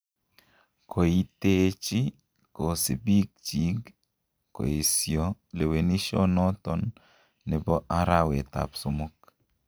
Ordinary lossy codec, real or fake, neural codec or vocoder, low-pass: none; real; none; none